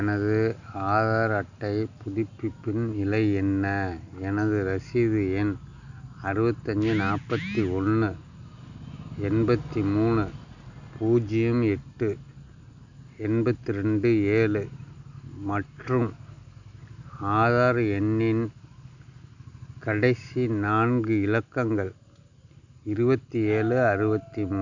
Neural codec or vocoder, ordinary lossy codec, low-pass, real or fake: none; none; 7.2 kHz; real